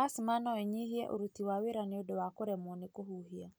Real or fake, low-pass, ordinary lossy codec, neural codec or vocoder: real; none; none; none